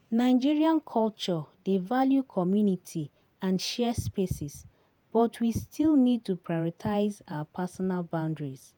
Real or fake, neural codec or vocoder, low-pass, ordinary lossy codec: fake; vocoder, 48 kHz, 128 mel bands, Vocos; none; none